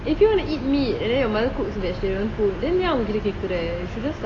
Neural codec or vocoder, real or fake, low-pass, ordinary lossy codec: none; real; 9.9 kHz; AAC, 32 kbps